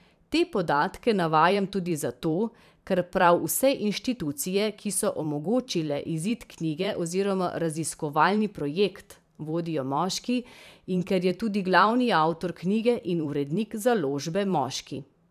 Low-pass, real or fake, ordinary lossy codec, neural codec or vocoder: 14.4 kHz; fake; none; vocoder, 44.1 kHz, 128 mel bands every 512 samples, BigVGAN v2